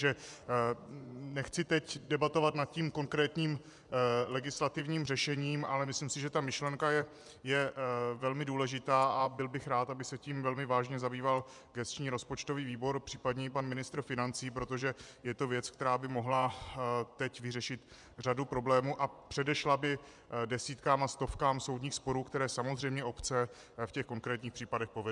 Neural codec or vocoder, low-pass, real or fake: vocoder, 24 kHz, 100 mel bands, Vocos; 10.8 kHz; fake